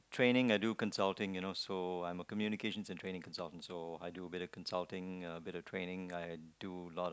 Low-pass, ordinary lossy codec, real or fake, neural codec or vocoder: none; none; real; none